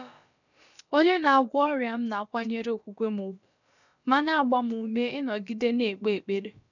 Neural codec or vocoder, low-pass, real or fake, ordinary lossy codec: codec, 16 kHz, about 1 kbps, DyCAST, with the encoder's durations; 7.2 kHz; fake; none